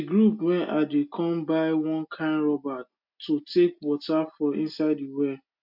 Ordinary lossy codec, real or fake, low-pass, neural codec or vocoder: MP3, 48 kbps; real; 5.4 kHz; none